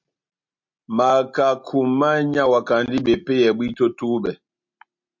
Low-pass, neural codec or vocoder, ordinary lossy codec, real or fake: 7.2 kHz; none; MP3, 48 kbps; real